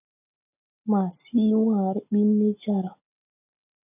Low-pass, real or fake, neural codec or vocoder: 3.6 kHz; real; none